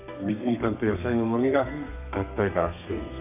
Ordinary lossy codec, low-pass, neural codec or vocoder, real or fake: none; 3.6 kHz; codec, 32 kHz, 1.9 kbps, SNAC; fake